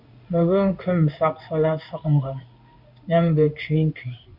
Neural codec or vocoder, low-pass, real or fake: codec, 16 kHz in and 24 kHz out, 1 kbps, XY-Tokenizer; 5.4 kHz; fake